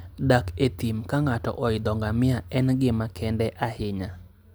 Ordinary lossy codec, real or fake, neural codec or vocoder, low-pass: none; real; none; none